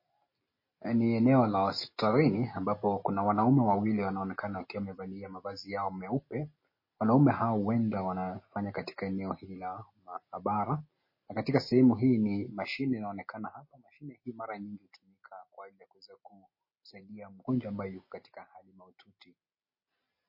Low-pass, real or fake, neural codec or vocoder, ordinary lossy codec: 5.4 kHz; real; none; MP3, 24 kbps